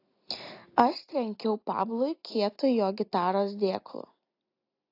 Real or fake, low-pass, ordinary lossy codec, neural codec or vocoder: real; 5.4 kHz; AAC, 32 kbps; none